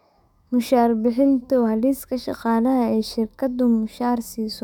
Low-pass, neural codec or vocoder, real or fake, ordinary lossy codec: 19.8 kHz; autoencoder, 48 kHz, 128 numbers a frame, DAC-VAE, trained on Japanese speech; fake; none